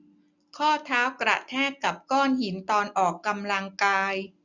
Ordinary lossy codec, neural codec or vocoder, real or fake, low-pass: none; none; real; 7.2 kHz